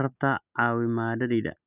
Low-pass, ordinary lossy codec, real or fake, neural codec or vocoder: 3.6 kHz; none; real; none